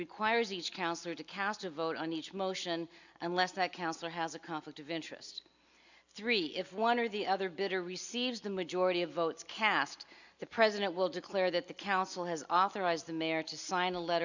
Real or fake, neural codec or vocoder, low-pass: real; none; 7.2 kHz